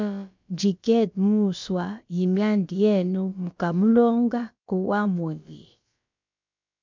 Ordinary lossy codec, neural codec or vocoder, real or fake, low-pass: MP3, 64 kbps; codec, 16 kHz, about 1 kbps, DyCAST, with the encoder's durations; fake; 7.2 kHz